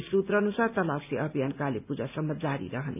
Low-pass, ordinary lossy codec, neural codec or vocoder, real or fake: 3.6 kHz; none; none; real